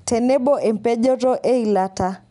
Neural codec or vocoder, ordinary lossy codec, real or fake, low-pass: none; MP3, 96 kbps; real; 10.8 kHz